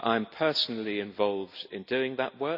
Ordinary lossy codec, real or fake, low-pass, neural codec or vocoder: none; real; 5.4 kHz; none